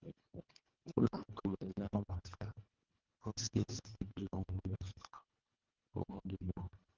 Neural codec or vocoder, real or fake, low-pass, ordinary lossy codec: codec, 24 kHz, 1.5 kbps, HILCodec; fake; 7.2 kHz; Opus, 24 kbps